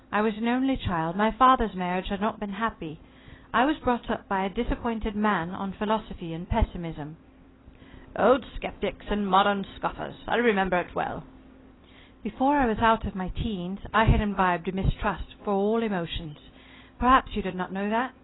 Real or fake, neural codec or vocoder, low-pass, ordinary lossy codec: fake; vocoder, 44.1 kHz, 128 mel bands every 256 samples, BigVGAN v2; 7.2 kHz; AAC, 16 kbps